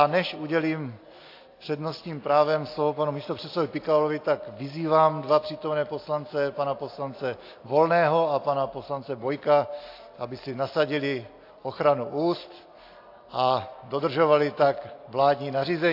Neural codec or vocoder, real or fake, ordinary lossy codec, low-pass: none; real; AAC, 32 kbps; 5.4 kHz